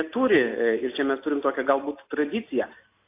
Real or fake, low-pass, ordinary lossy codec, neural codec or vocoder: real; 3.6 kHz; AAC, 24 kbps; none